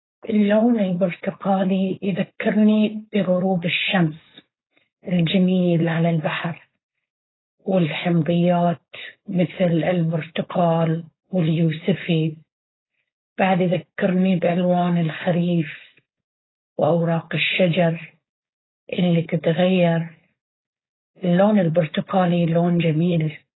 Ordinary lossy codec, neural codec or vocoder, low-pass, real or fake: AAC, 16 kbps; codec, 16 kHz, 4.8 kbps, FACodec; 7.2 kHz; fake